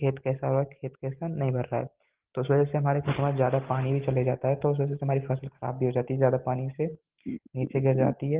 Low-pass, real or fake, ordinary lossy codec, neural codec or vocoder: 3.6 kHz; real; Opus, 16 kbps; none